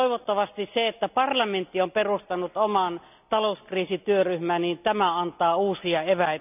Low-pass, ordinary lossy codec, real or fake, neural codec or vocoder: 3.6 kHz; none; real; none